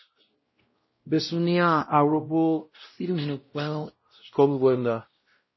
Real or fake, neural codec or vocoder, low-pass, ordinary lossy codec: fake; codec, 16 kHz, 0.5 kbps, X-Codec, WavLM features, trained on Multilingual LibriSpeech; 7.2 kHz; MP3, 24 kbps